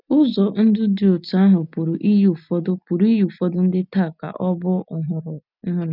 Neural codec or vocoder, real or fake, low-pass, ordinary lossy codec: none; real; 5.4 kHz; none